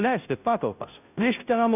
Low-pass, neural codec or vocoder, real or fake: 3.6 kHz; codec, 16 kHz, 0.5 kbps, FunCodec, trained on Chinese and English, 25 frames a second; fake